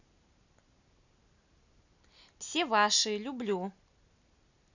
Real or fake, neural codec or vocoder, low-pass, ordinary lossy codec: real; none; 7.2 kHz; none